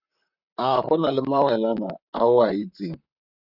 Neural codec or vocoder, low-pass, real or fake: vocoder, 44.1 kHz, 128 mel bands, Pupu-Vocoder; 5.4 kHz; fake